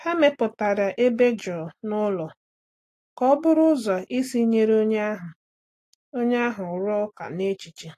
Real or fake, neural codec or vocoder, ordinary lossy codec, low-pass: real; none; AAC, 48 kbps; 14.4 kHz